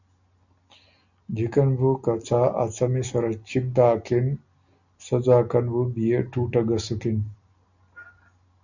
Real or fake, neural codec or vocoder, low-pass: real; none; 7.2 kHz